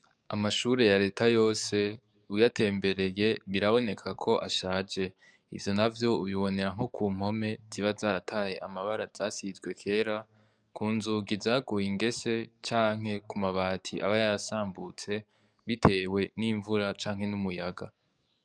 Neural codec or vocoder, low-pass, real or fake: codec, 44.1 kHz, 7.8 kbps, DAC; 9.9 kHz; fake